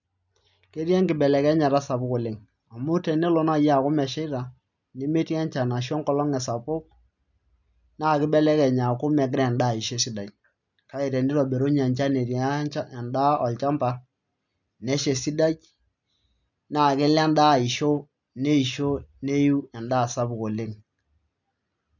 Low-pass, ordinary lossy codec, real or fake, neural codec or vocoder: 7.2 kHz; none; real; none